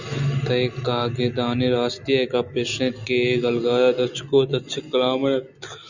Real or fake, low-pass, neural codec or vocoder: real; 7.2 kHz; none